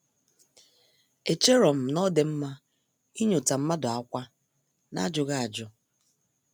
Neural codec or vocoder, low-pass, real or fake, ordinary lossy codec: none; none; real; none